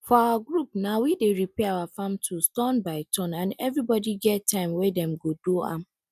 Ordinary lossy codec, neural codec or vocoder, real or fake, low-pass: none; none; real; 14.4 kHz